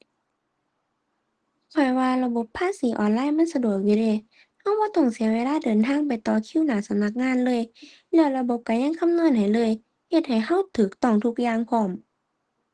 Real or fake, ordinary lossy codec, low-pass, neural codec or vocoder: real; Opus, 16 kbps; 9.9 kHz; none